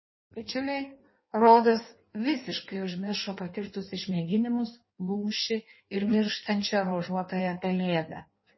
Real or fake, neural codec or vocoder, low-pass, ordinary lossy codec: fake; codec, 16 kHz in and 24 kHz out, 1.1 kbps, FireRedTTS-2 codec; 7.2 kHz; MP3, 24 kbps